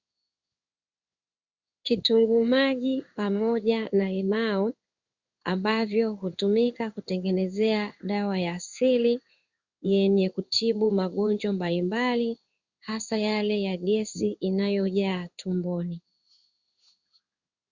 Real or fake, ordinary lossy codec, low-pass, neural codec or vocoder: fake; Opus, 64 kbps; 7.2 kHz; codec, 16 kHz in and 24 kHz out, 1 kbps, XY-Tokenizer